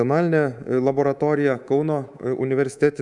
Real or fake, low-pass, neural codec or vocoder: fake; 10.8 kHz; codec, 24 kHz, 3.1 kbps, DualCodec